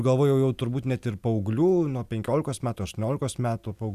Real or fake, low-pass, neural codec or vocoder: real; 14.4 kHz; none